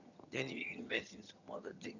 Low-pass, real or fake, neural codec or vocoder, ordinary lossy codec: 7.2 kHz; fake; vocoder, 22.05 kHz, 80 mel bands, HiFi-GAN; none